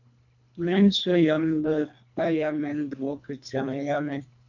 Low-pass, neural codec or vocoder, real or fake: 7.2 kHz; codec, 24 kHz, 1.5 kbps, HILCodec; fake